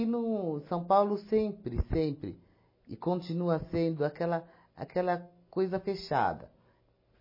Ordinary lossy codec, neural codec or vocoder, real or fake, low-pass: MP3, 24 kbps; none; real; 5.4 kHz